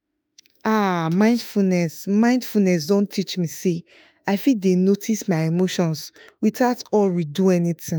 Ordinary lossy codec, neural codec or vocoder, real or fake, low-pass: none; autoencoder, 48 kHz, 32 numbers a frame, DAC-VAE, trained on Japanese speech; fake; none